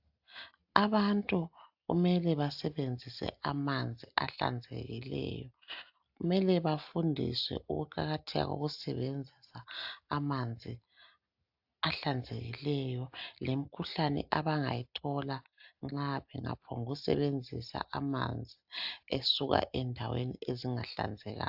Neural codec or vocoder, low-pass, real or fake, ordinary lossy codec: none; 5.4 kHz; real; AAC, 48 kbps